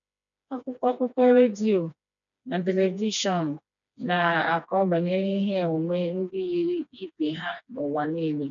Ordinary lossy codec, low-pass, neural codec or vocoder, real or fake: none; 7.2 kHz; codec, 16 kHz, 2 kbps, FreqCodec, smaller model; fake